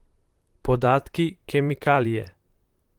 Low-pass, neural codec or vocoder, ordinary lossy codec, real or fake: 19.8 kHz; vocoder, 44.1 kHz, 128 mel bands, Pupu-Vocoder; Opus, 24 kbps; fake